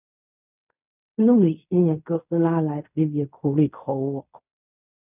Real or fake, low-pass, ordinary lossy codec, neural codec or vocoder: fake; 3.6 kHz; none; codec, 16 kHz in and 24 kHz out, 0.4 kbps, LongCat-Audio-Codec, fine tuned four codebook decoder